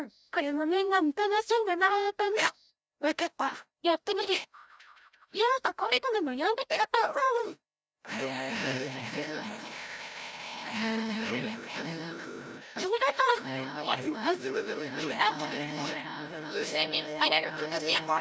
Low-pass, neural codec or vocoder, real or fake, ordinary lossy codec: none; codec, 16 kHz, 0.5 kbps, FreqCodec, larger model; fake; none